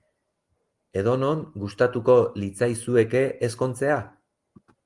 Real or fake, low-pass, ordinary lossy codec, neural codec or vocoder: real; 10.8 kHz; Opus, 24 kbps; none